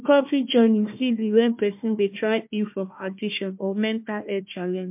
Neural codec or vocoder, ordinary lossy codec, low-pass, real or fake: codec, 16 kHz, 1 kbps, FunCodec, trained on Chinese and English, 50 frames a second; MP3, 32 kbps; 3.6 kHz; fake